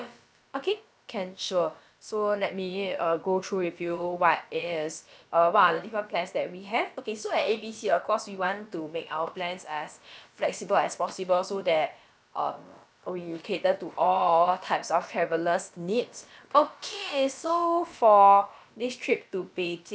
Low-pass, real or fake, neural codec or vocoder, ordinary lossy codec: none; fake; codec, 16 kHz, about 1 kbps, DyCAST, with the encoder's durations; none